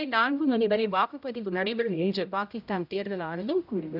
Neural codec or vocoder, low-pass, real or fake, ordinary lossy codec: codec, 16 kHz, 0.5 kbps, X-Codec, HuBERT features, trained on general audio; 5.4 kHz; fake; none